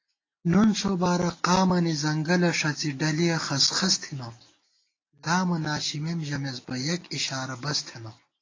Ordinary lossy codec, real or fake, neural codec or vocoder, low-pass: AAC, 32 kbps; real; none; 7.2 kHz